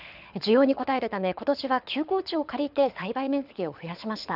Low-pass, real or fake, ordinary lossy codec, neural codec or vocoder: 5.4 kHz; fake; none; codec, 24 kHz, 6 kbps, HILCodec